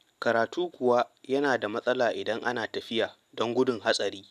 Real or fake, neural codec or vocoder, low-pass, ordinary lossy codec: real; none; 14.4 kHz; none